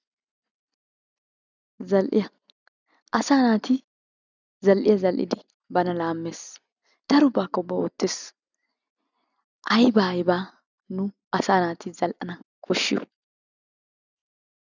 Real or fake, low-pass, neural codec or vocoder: real; 7.2 kHz; none